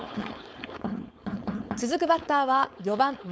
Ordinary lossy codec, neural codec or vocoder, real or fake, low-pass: none; codec, 16 kHz, 4.8 kbps, FACodec; fake; none